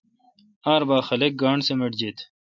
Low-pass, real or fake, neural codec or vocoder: 7.2 kHz; real; none